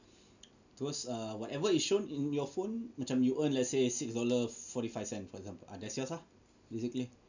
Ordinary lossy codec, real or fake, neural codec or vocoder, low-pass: none; real; none; 7.2 kHz